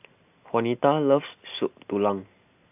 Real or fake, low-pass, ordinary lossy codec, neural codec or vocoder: real; 3.6 kHz; AAC, 32 kbps; none